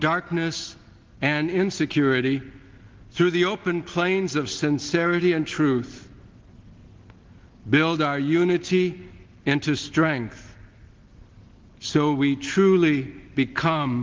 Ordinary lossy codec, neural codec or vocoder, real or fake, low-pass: Opus, 16 kbps; none; real; 7.2 kHz